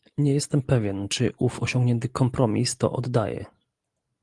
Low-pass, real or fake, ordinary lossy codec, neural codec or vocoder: 10.8 kHz; real; Opus, 24 kbps; none